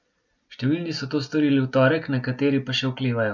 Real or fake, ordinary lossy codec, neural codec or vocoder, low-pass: real; none; none; 7.2 kHz